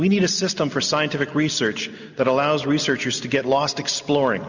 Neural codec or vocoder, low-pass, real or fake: none; 7.2 kHz; real